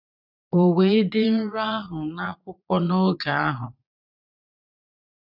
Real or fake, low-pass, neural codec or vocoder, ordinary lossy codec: fake; 5.4 kHz; vocoder, 22.05 kHz, 80 mel bands, Vocos; none